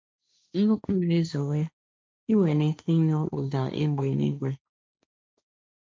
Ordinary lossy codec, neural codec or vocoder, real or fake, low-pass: none; codec, 16 kHz, 1.1 kbps, Voila-Tokenizer; fake; none